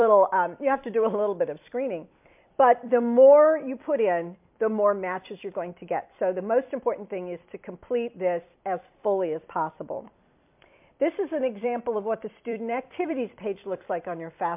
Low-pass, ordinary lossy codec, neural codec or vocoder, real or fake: 3.6 kHz; MP3, 32 kbps; vocoder, 44.1 kHz, 128 mel bands every 256 samples, BigVGAN v2; fake